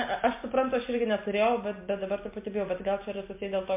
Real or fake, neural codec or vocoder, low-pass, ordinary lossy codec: real; none; 3.6 kHz; MP3, 24 kbps